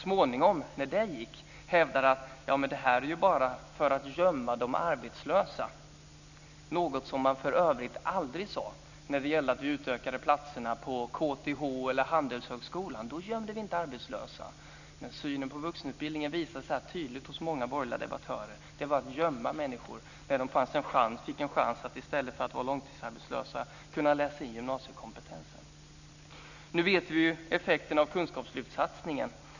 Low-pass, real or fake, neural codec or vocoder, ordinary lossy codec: 7.2 kHz; real; none; none